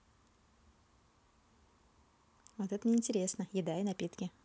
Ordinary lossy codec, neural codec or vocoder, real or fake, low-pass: none; none; real; none